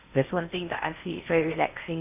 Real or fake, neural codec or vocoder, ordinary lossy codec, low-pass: fake; codec, 16 kHz in and 24 kHz out, 0.6 kbps, FocalCodec, streaming, 2048 codes; none; 3.6 kHz